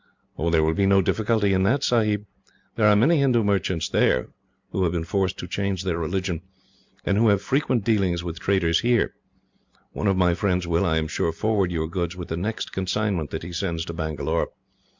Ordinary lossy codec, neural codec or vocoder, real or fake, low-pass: MP3, 64 kbps; none; real; 7.2 kHz